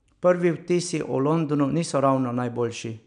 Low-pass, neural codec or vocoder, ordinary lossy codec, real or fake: 9.9 kHz; none; none; real